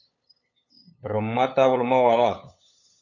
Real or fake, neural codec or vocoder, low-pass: fake; codec, 16 kHz, 8 kbps, FunCodec, trained on LibriTTS, 25 frames a second; 7.2 kHz